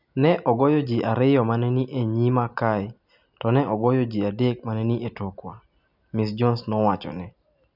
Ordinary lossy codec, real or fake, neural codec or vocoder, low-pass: none; real; none; 5.4 kHz